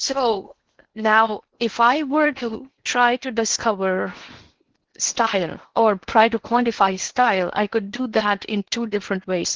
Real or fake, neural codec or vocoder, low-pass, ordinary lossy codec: fake; codec, 16 kHz in and 24 kHz out, 0.8 kbps, FocalCodec, streaming, 65536 codes; 7.2 kHz; Opus, 16 kbps